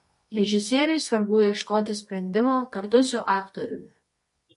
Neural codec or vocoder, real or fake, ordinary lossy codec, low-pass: codec, 24 kHz, 0.9 kbps, WavTokenizer, medium music audio release; fake; MP3, 48 kbps; 10.8 kHz